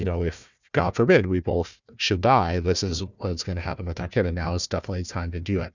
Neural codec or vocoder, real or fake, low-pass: codec, 16 kHz, 1 kbps, FunCodec, trained on Chinese and English, 50 frames a second; fake; 7.2 kHz